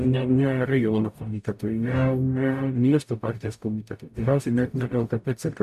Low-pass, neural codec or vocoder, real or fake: 14.4 kHz; codec, 44.1 kHz, 0.9 kbps, DAC; fake